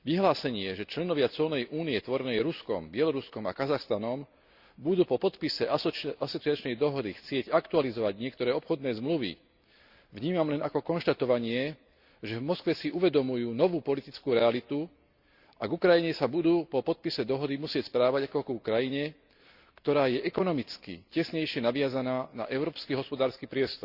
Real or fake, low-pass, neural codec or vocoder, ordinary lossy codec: real; 5.4 kHz; none; Opus, 64 kbps